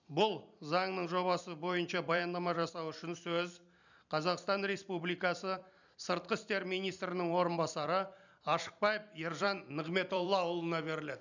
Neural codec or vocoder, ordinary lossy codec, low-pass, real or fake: vocoder, 44.1 kHz, 128 mel bands every 256 samples, BigVGAN v2; none; 7.2 kHz; fake